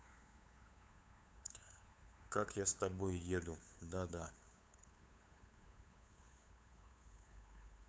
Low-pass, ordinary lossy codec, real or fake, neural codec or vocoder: none; none; fake; codec, 16 kHz, 8 kbps, FunCodec, trained on LibriTTS, 25 frames a second